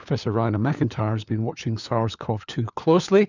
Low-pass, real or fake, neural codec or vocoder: 7.2 kHz; fake; codec, 16 kHz, 4 kbps, FunCodec, trained on LibriTTS, 50 frames a second